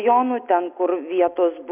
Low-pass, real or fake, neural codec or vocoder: 3.6 kHz; real; none